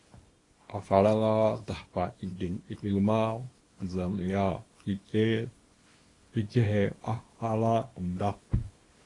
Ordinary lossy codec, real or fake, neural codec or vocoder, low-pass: AAC, 32 kbps; fake; codec, 24 kHz, 0.9 kbps, WavTokenizer, small release; 10.8 kHz